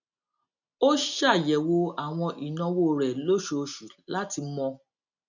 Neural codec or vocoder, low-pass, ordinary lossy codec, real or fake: none; 7.2 kHz; none; real